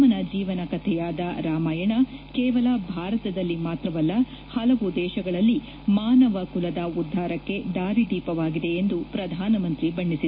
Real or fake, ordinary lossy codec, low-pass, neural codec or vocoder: real; MP3, 24 kbps; 5.4 kHz; none